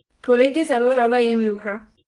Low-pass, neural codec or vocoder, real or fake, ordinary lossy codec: 10.8 kHz; codec, 24 kHz, 0.9 kbps, WavTokenizer, medium music audio release; fake; Opus, 24 kbps